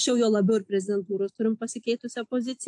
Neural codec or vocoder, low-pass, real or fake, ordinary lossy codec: none; 10.8 kHz; real; AAC, 64 kbps